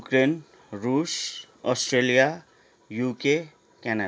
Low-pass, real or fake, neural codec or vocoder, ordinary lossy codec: none; real; none; none